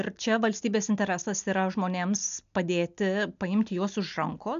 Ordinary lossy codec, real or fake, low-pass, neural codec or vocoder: AAC, 96 kbps; real; 7.2 kHz; none